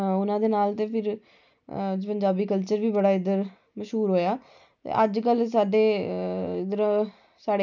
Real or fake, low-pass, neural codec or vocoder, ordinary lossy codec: real; 7.2 kHz; none; none